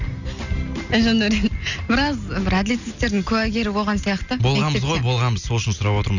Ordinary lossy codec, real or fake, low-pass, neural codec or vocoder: none; real; 7.2 kHz; none